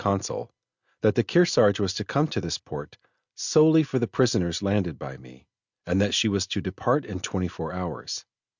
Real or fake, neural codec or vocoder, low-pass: real; none; 7.2 kHz